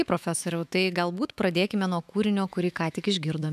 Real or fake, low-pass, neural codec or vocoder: fake; 14.4 kHz; autoencoder, 48 kHz, 128 numbers a frame, DAC-VAE, trained on Japanese speech